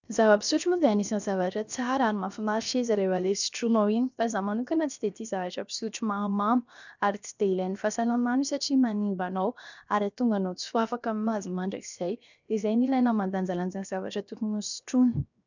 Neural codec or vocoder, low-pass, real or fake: codec, 16 kHz, 0.7 kbps, FocalCodec; 7.2 kHz; fake